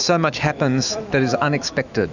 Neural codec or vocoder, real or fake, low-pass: autoencoder, 48 kHz, 128 numbers a frame, DAC-VAE, trained on Japanese speech; fake; 7.2 kHz